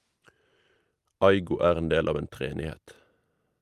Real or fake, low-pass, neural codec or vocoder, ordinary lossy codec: real; 14.4 kHz; none; Opus, 32 kbps